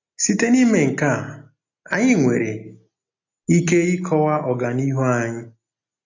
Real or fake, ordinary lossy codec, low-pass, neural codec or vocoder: real; AAC, 48 kbps; 7.2 kHz; none